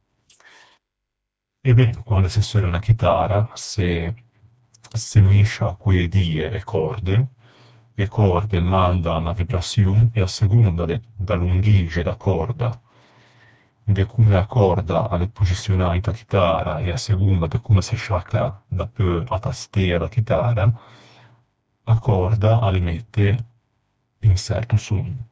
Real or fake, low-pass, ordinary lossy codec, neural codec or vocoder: fake; none; none; codec, 16 kHz, 2 kbps, FreqCodec, smaller model